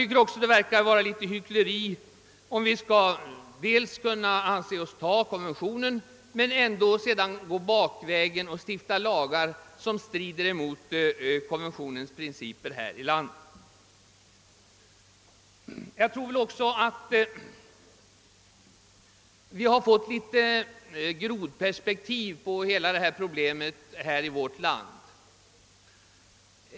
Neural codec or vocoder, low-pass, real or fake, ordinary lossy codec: none; none; real; none